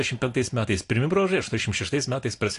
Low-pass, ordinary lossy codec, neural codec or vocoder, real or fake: 10.8 kHz; AAC, 48 kbps; none; real